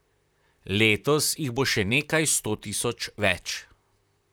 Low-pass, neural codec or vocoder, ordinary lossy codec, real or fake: none; vocoder, 44.1 kHz, 128 mel bands, Pupu-Vocoder; none; fake